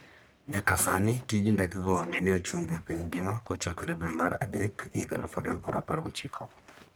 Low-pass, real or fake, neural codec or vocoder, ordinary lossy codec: none; fake; codec, 44.1 kHz, 1.7 kbps, Pupu-Codec; none